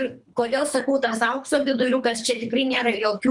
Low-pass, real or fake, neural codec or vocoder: 10.8 kHz; fake; codec, 24 kHz, 3 kbps, HILCodec